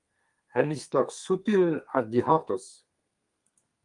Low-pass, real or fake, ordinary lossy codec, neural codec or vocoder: 10.8 kHz; fake; Opus, 32 kbps; codec, 32 kHz, 1.9 kbps, SNAC